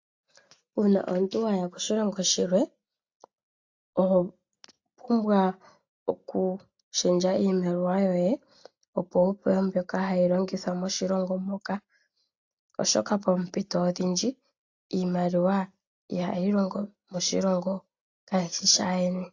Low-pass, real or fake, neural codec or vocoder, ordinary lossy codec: 7.2 kHz; real; none; AAC, 48 kbps